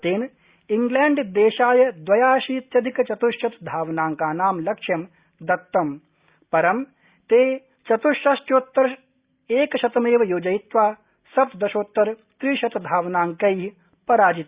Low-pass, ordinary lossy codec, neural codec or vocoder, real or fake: 3.6 kHz; Opus, 64 kbps; none; real